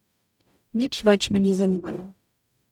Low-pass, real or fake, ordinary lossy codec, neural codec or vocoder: 19.8 kHz; fake; none; codec, 44.1 kHz, 0.9 kbps, DAC